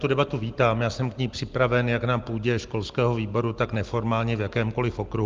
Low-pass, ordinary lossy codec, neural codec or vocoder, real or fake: 7.2 kHz; Opus, 24 kbps; none; real